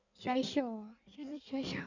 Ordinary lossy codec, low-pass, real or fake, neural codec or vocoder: none; 7.2 kHz; fake; codec, 16 kHz in and 24 kHz out, 1.1 kbps, FireRedTTS-2 codec